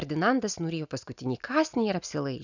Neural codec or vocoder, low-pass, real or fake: none; 7.2 kHz; real